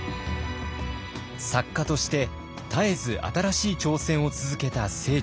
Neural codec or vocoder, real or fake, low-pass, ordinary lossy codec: none; real; none; none